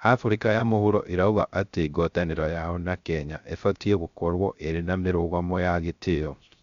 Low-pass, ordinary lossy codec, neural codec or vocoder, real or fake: 7.2 kHz; Opus, 64 kbps; codec, 16 kHz, 0.3 kbps, FocalCodec; fake